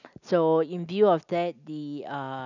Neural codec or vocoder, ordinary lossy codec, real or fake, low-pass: none; none; real; 7.2 kHz